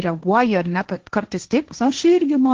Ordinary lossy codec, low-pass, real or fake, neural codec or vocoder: Opus, 16 kbps; 7.2 kHz; fake; codec, 16 kHz, 1.1 kbps, Voila-Tokenizer